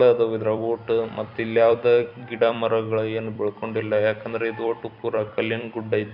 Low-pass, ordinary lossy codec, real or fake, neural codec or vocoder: 5.4 kHz; none; fake; vocoder, 44.1 kHz, 128 mel bands every 256 samples, BigVGAN v2